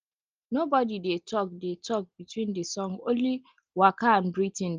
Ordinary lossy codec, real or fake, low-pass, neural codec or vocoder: Opus, 24 kbps; real; 7.2 kHz; none